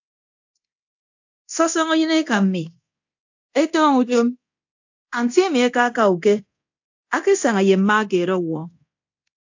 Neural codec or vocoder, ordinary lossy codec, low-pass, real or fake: codec, 24 kHz, 0.9 kbps, DualCodec; AAC, 48 kbps; 7.2 kHz; fake